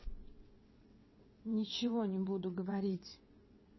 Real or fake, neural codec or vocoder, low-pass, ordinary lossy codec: fake; vocoder, 22.05 kHz, 80 mel bands, WaveNeXt; 7.2 kHz; MP3, 24 kbps